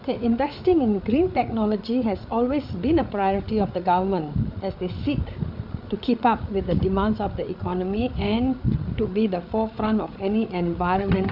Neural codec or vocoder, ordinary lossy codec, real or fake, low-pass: codec, 16 kHz, 8 kbps, FreqCodec, larger model; none; fake; 5.4 kHz